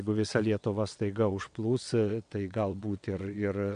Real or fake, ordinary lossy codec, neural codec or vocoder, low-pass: fake; MP3, 64 kbps; vocoder, 22.05 kHz, 80 mel bands, WaveNeXt; 9.9 kHz